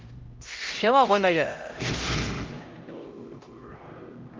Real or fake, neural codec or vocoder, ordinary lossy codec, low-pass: fake; codec, 16 kHz, 0.5 kbps, X-Codec, HuBERT features, trained on LibriSpeech; Opus, 32 kbps; 7.2 kHz